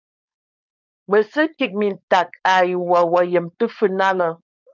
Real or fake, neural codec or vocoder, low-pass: fake; codec, 16 kHz, 4.8 kbps, FACodec; 7.2 kHz